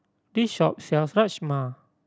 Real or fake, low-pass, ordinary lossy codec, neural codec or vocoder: real; none; none; none